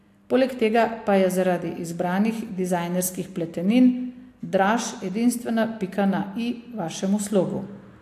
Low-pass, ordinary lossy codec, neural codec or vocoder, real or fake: 14.4 kHz; AAC, 64 kbps; none; real